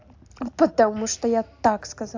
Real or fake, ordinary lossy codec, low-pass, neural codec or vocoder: real; AAC, 48 kbps; 7.2 kHz; none